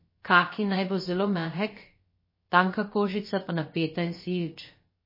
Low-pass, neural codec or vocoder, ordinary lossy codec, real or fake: 5.4 kHz; codec, 16 kHz, about 1 kbps, DyCAST, with the encoder's durations; MP3, 24 kbps; fake